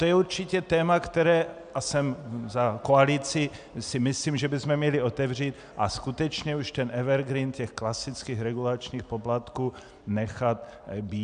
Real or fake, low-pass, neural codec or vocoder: real; 9.9 kHz; none